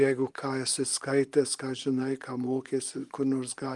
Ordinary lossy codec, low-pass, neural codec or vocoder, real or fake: Opus, 32 kbps; 10.8 kHz; vocoder, 24 kHz, 100 mel bands, Vocos; fake